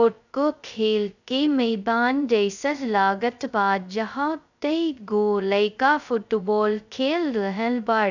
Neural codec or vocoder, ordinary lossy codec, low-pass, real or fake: codec, 16 kHz, 0.2 kbps, FocalCodec; none; 7.2 kHz; fake